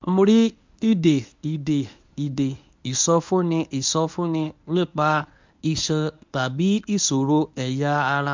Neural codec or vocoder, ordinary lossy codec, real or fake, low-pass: codec, 24 kHz, 0.9 kbps, WavTokenizer, medium speech release version 1; MP3, 64 kbps; fake; 7.2 kHz